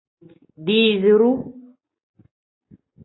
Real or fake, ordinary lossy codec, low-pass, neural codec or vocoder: real; AAC, 16 kbps; 7.2 kHz; none